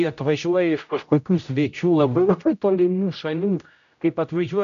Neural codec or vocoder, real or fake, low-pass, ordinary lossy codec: codec, 16 kHz, 0.5 kbps, X-Codec, HuBERT features, trained on general audio; fake; 7.2 kHz; MP3, 96 kbps